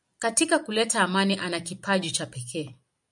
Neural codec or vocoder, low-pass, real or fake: none; 10.8 kHz; real